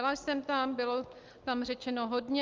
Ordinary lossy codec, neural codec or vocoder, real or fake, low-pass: Opus, 24 kbps; none; real; 7.2 kHz